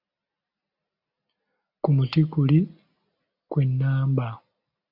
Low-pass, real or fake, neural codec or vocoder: 5.4 kHz; real; none